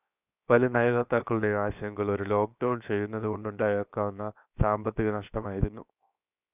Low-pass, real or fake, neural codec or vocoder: 3.6 kHz; fake; codec, 16 kHz, 0.7 kbps, FocalCodec